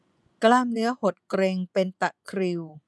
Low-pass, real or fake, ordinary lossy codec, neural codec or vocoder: none; fake; none; vocoder, 24 kHz, 100 mel bands, Vocos